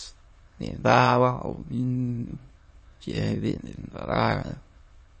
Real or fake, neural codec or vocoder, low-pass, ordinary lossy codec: fake; autoencoder, 22.05 kHz, a latent of 192 numbers a frame, VITS, trained on many speakers; 9.9 kHz; MP3, 32 kbps